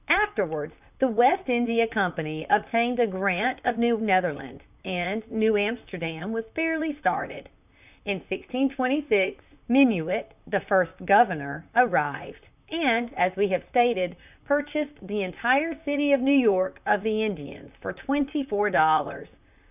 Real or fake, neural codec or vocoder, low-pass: fake; vocoder, 44.1 kHz, 128 mel bands, Pupu-Vocoder; 3.6 kHz